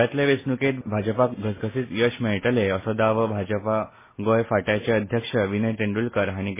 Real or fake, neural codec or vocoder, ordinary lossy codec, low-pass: real; none; MP3, 16 kbps; 3.6 kHz